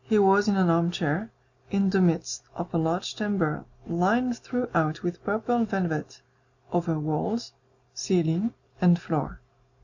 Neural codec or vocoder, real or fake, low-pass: none; real; 7.2 kHz